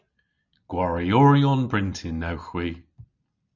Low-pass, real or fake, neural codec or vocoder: 7.2 kHz; real; none